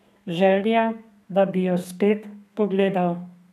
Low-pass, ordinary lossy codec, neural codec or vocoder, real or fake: 14.4 kHz; none; codec, 32 kHz, 1.9 kbps, SNAC; fake